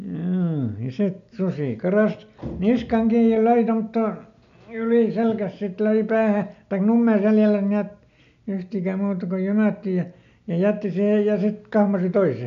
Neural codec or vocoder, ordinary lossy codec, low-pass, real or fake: none; MP3, 64 kbps; 7.2 kHz; real